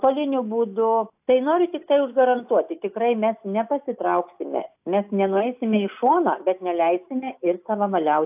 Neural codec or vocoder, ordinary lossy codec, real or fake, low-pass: none; AAC, 32 kbps; real; 3.6 kHz